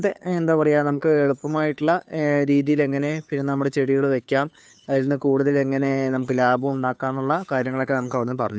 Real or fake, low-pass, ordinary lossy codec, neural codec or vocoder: fake; none; none; codec, 16 kHz, 2 kbps, FunCodec, trained on Chinese and English, 25 frames a second